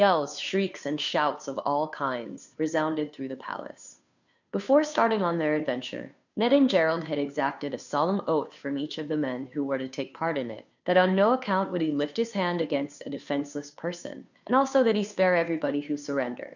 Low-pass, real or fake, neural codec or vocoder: 7.2 kHz; fake; codec, 16 kHz, 2 kbps, FunCodec, trained on Chinese and English, 25 frames a second